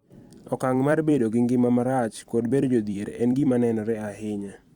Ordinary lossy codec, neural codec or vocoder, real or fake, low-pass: Opus, 64 kbps; vocoder, 44.1 kHz, 128 mel bands every 256 samples, BigVGAN v2; fake; 19.8 kHz